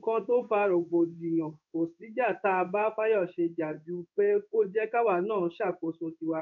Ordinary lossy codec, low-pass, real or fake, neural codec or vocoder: none; 7.2 kHz; fake; codec, 16 kHz in and 24 kHz out, 1 kbps, XY-Tokenizer